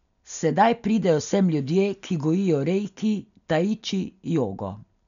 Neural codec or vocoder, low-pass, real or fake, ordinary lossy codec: none; 7.2 kHz; real; none